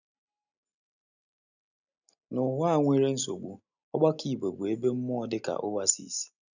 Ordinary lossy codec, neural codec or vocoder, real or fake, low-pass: none; none; real; 7.2 kHz